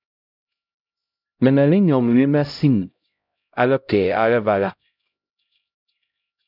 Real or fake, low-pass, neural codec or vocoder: fake; 5.4 kHz; codec, 16 kHz, 0.5 kbps, X-Codec, HuBERT features, trained on LibriSpeech